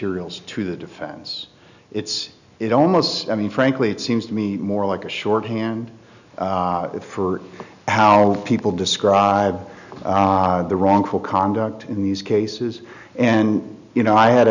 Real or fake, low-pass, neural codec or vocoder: real; 7.2 kHz; none